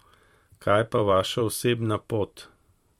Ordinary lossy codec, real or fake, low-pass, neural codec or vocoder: MP3, 64 kbps; fake; 19.8 kHz; vocoder, 44.1 kHz, 128 mel bands every 512 samples, BigVGAN v2